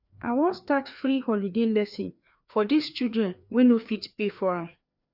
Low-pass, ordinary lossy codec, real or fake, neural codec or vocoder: 5.4 kHz; none; fake; codec, 16 kHz, 2 kbps, FreqCodec, larger model